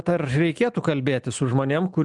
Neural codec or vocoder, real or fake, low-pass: none; real; 10.8 kHz